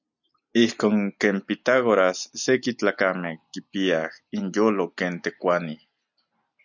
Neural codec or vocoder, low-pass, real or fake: none; 7.2 kHz; real